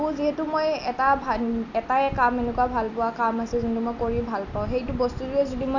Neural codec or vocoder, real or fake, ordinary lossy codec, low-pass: none; real; none; 7.2 kHz